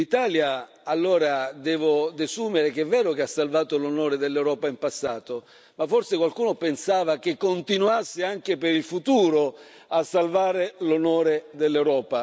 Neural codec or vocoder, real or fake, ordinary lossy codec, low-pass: none; real; none; none